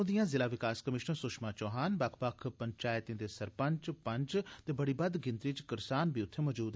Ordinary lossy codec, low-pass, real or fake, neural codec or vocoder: none; none; real; none